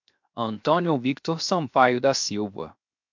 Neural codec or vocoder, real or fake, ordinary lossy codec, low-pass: codec, 16 kHz, 0.7 kbps, FocalCodec; fake; MP3, 64 kbps; 7.2 kHz